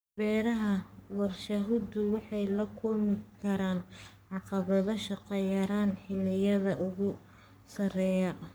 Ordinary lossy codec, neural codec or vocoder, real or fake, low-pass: none; codec, 44.1 kHz, 3.4 kbps, Pupu-Codec; fake; none